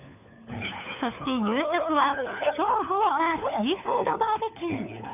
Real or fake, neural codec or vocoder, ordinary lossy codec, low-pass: fake; codec, 16 kHz, 4 kbps, FunCodec, trained on LibriTTS, 50 frames a second; none; 3.6 kHz